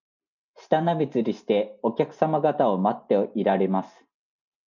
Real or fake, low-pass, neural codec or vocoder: real; 7.2 kHz; none